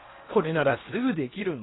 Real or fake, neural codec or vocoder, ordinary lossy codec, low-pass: fake; codec, 16 kHz in and 24 kHz out, 0.8 kbps, FocalCodec, streaming, 65536 codes; AAC, 16 kbps; 7.2 kHz